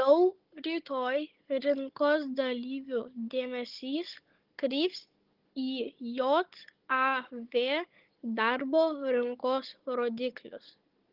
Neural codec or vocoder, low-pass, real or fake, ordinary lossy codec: codec, 16 kHz, 16 kbps, FunCodec, trained on Chinese and English, 50 frames a second; 5.4 kHz; fake; Opus, 16 kbps